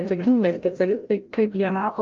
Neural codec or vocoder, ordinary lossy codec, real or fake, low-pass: codec, 16 kHz, 0.5 kbps, FreqCodec, larger model; Opus, 32 kbps; fake; 7.2 kHz